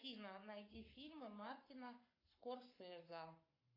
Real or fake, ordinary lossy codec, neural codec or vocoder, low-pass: fake; AAC, 24 kbps; codec, 44.1 kHz, 7.8 kbps, Pupu-Codec; 5.4 kHz